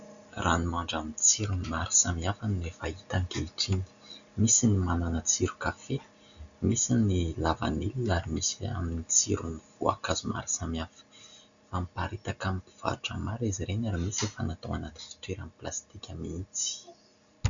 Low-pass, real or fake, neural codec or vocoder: 7.2 kHz; real; none